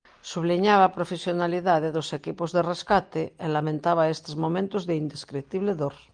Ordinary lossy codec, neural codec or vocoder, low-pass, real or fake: Opus, 24 kbps; none; 9.9 kHz; real